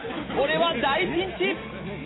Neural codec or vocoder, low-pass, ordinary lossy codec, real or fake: none; 7.2 kHz; AAC, 16 kbps; real